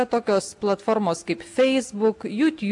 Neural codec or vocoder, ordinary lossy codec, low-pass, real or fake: none; AAC, 64 kbps; 10.8 kHz; real